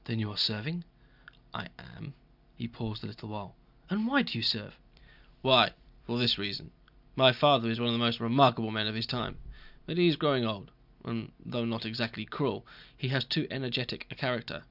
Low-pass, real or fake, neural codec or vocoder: 5.4 kHz; real; none